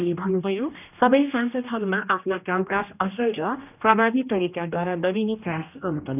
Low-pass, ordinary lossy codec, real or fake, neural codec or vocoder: 3.6 kHz; none; fake; codec, 16 kHz, 1 kbps, X-Codec, HuBERT features, trained on general audio